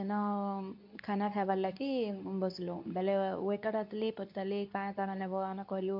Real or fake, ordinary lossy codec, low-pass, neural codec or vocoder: fake; AAC, 48 kbps; 5.4 kHz; codec, 24 kHz, 0.9 kbps, WavTokenizer, medium speech release version 2